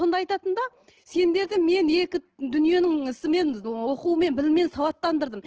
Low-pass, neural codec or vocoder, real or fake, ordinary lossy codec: 7.2 kHz; none; real; Opus, 16 kbps